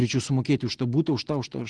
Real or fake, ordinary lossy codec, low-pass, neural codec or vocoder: real; Opus, 16 kbps; 9.9 kHz; none